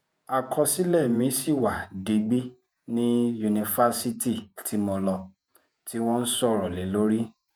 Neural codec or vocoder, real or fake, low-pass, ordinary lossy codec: vocoder, 48 kHz, 128 mel bands, Vocos; fake; none; none